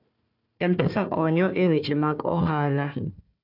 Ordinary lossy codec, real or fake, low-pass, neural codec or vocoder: none; fake; 5.4 kHz; codec, 16 kHz, 1 kbps, FunCodec, trained on Chinese and English, 50 frames a second